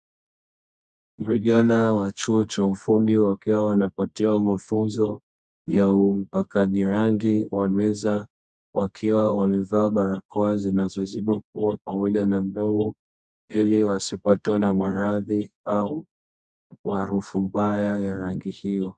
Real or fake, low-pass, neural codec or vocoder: fake; 10.8 kHz; codec, 24 kHz, 0.9 kbps, WavTokenizer, medium music audio release